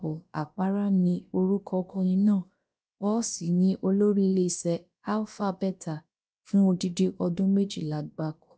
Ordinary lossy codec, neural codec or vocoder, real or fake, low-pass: none; codec, 16 kHz, about 1 kbps, DyCAST, with the encoder's durations; fake; none